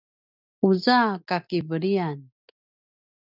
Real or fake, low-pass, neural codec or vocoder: real; 5.4 kHz; none